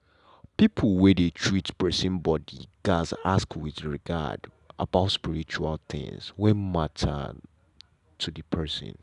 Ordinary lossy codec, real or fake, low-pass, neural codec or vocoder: none; real; 10.8 kHz; none